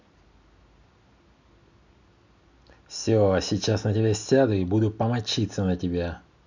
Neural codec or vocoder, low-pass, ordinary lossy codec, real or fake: none; 7.2 kHz; none; real